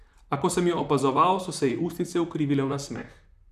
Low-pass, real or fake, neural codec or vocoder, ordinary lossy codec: 14.4 kHz; fake; vocoder, 44.1 kHz, 128 mel bands, Pupu-Vocoder; none